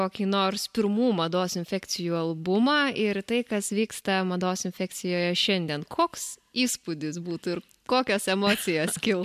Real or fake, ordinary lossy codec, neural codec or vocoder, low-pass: real; MP3, 96 kbps; none; 14.4 kHz